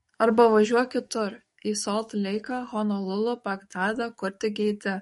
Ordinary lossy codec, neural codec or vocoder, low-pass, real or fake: MP3, 48 kbps; codec, 44.1 kHz, 7.8 kbps, DAC; 19.8 kHz; fake